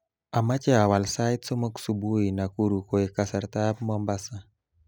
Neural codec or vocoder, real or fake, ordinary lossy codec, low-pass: none; real; none; none